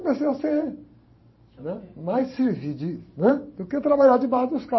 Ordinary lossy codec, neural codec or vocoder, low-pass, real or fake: MP3, 24 kbps; vocoder, 44.1 kHz, 128 mel bands every 512 samples, BigVGAN v2; 7.2 kHz; fake